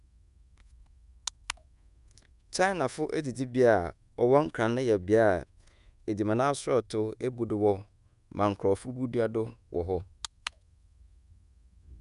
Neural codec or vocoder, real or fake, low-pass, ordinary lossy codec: codec, 24 kHz, 1.2 kbps, DualCodec; fake; 10.8 kHz; none